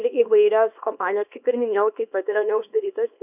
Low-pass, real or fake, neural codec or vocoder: 3.6 kHz; fake; codec, 24 kHz, 0.9 kbps, WavTokenizer, small release